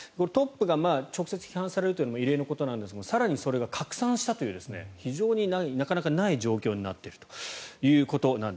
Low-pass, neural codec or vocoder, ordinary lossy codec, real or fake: none; none; none; real